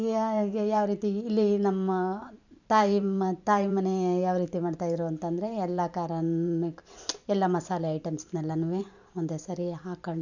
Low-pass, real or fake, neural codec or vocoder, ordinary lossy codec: 7.2 kHz; fake; vocoder, 44.1 kHz, 128 mel bands every 512 samples, BigVGAN v2; none